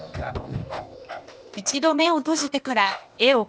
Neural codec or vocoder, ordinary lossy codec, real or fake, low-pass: codec, 16 kHz, 0.8 kbps, ZipCodec; none; fake; none